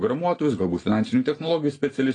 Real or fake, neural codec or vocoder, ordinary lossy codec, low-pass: real; none; AAC, 32 kbps; 9.9 kHz